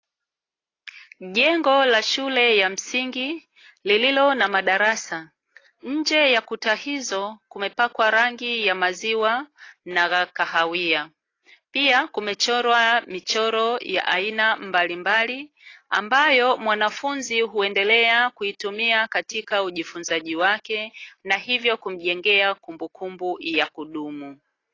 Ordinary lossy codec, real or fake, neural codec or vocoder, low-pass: AAC, 32 kbps; real; none; 7.2 kHz